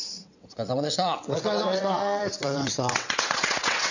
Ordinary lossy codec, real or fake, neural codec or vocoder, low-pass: none; fake; vocoder, 22.05 kHz, 80 mel bands, WaveNeXt; 7.2 kHz